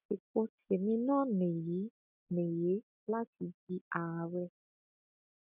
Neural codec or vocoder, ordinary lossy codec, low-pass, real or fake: none; none; 3.6 kHz; real